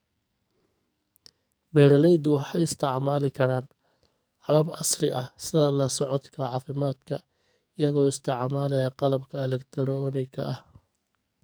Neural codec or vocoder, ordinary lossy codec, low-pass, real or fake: codec, 44.1 kHz, 2.6 kbps, SNAC; none; none; fake